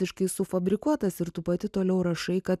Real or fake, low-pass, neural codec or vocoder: real; 14.4 kHz; none